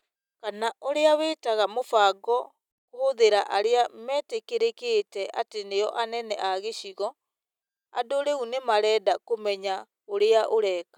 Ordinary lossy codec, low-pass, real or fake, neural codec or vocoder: none; 19.8 kHz; real; none